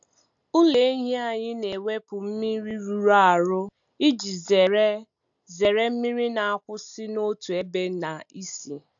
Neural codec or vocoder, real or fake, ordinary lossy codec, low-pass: none; real; AAC, 64 kbps; 7.2 kHz